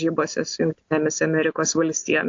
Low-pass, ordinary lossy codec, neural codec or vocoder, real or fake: 7.2 kHz; AAC, 48 kbps; none; real